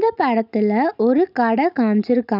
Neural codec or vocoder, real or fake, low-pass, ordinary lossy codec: none; real; 5.4 kHz; none